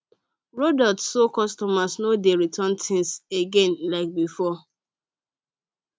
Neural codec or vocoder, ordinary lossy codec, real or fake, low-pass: none; none; real; none